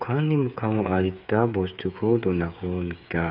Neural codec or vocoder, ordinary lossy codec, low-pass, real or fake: codec, 16 kHz, 8 kbps, FreqCodec, smaller model; none; 5.4 kHz; fake